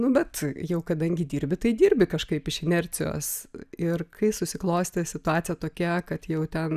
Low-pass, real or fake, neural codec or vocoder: 14.4 kHz; real; none